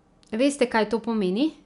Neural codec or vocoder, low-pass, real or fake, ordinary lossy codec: none; 10.8 kHz; real; none